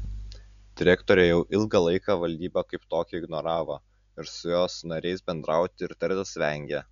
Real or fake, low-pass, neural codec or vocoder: real; 7.2 kHz; none